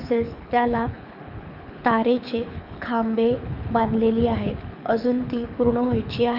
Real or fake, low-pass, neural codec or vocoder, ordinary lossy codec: fake; 5.4 kHz; codec, 24 kHz, 6 kbps, HILCodec; none